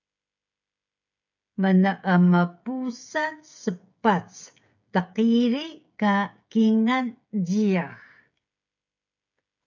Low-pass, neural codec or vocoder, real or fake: 7.2 kHz; codec, 16 kHz, 16 kbps, FreqCodec, smaller model; fake